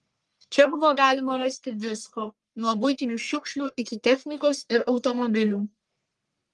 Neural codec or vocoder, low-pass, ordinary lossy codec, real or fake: codec, 44.1 kHz, 1.7 kbps, Pupu-Codec; 10.8 kHz; Opus, 32 kbps; fake